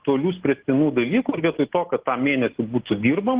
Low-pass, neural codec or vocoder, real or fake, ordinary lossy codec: 3.6 kHz; none; real; Opus, 16 kbps